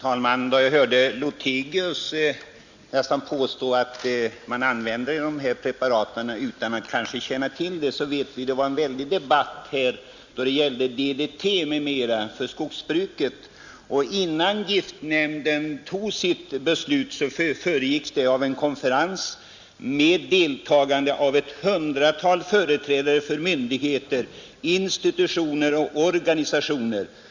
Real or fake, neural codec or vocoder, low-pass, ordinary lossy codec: real; none; 7.2 kHz; Opus, 64 kbps